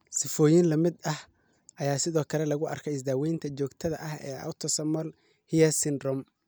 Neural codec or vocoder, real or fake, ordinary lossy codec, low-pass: none; real; none; none